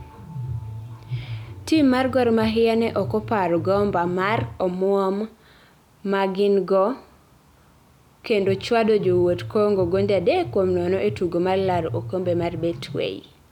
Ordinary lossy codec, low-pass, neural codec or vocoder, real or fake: none; 19.8 kHz; none; real